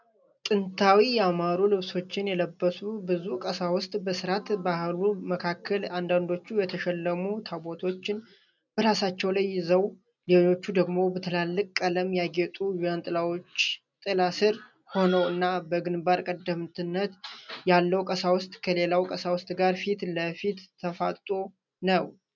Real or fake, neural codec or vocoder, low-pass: real; none; 7.2 kHz